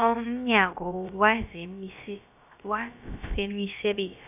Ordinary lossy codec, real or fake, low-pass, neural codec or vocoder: none; fake; 3.6 kHz; codec, 16 kHz, about 1 kbps, DyCAST, with the encoder's durations